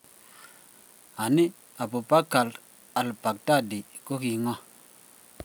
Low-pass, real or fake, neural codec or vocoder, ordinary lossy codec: none; real; none; none